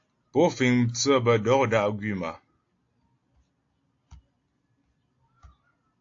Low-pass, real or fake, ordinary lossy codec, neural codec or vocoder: 7.2 kHz; real; AAC, 48 kbps; none